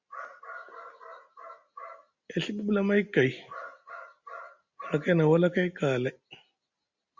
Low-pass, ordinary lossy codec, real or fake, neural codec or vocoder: 7.2 kHz; Opus, 64 kbps; real; none